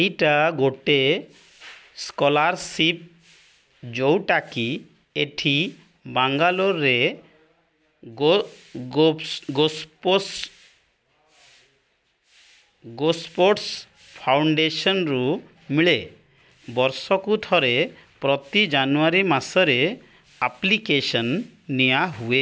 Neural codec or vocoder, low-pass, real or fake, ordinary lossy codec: none; none; real; none